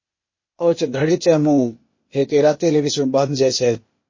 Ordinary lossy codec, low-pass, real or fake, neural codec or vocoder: MP3, 32 kbps; 7.2 kHz; fake; codec, 16 kHz, 0.8 kbps, ZipCodec